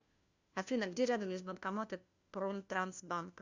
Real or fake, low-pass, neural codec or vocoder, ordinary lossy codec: fake; 7.2 kHz; codec, 16 kHz, 1 kbps, FunCodec, trained on LibriTTS, 50 frames a second; Opus, 64 kbps